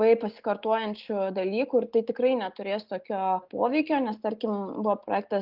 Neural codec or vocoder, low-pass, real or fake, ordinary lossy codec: none; 5.4 kHz; real; Opus, 32 kbps